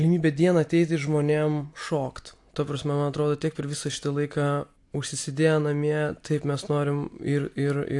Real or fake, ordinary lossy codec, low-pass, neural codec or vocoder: real; AAC, 64 kbps; 10.8 kHz; none